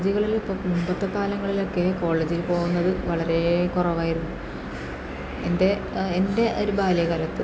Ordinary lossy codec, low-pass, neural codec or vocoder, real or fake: none; none; none; real